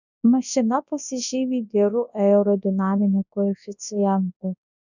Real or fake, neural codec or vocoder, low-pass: fake; codec, 24 kHz, 0.9 kbps, WavTokenizer, large speech release; 7.2 kHz